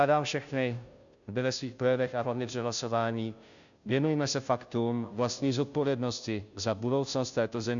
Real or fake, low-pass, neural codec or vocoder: fake; 7.2 kHz; codec, 16 kHz, 0.5 kbps, FunCodec, trained on Chinese and English, 25 frames a second